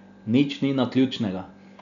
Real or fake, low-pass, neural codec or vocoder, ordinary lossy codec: real; 7.2 kHz; none; none